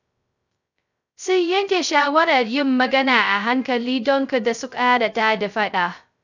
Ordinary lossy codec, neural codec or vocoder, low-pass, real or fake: none; codec, 16 kHz, 0.2 kbps, FocalCodec; 7.2 kHz; fake